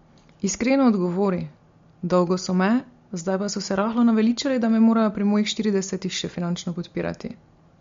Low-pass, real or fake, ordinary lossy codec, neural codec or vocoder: 7.2 kHz; real; MP3, 48 kbps; none